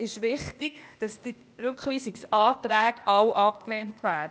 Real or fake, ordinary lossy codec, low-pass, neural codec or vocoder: fake; none; none; codec, 16 kHz, 0.8 kbps, ZipCodec